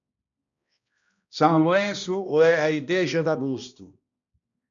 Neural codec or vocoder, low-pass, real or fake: codec, 16 kHz, 1 kbps, X-Codec, HuBERT features, trained on balanced general audio; 7.2 kHz; fake